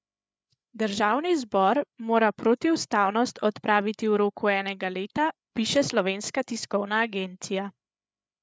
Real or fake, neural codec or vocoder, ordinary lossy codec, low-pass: fake; codec, 16 kHz, 8 kbps, FreqCodec, larger model; none; none